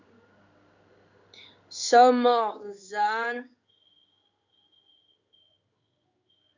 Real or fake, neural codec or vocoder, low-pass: fake; codec, 16 kHz in and 24 kHz out, 1 kbps, XY-Tokenizer; 7.2 kHz